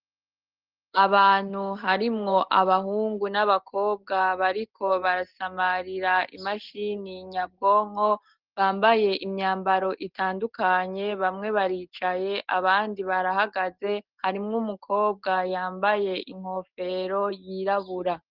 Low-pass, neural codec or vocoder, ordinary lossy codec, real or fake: 5.4 kHz; none; Opus, 16 kbps; real